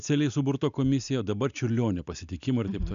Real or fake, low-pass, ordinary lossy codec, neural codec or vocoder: real; 7.2 kHz; MP3, 96 kbps; none